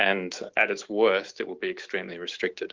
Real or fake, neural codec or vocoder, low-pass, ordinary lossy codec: fake; autoencoder, 48 kHz, 128 numbers a frame, DAC-VAE, trained on Japanese speech; 7.2 kHz; Opus, 16 kbps